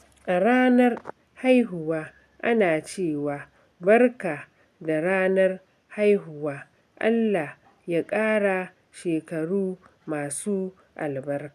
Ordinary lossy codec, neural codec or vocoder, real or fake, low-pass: none; none; real; 14.4 kHz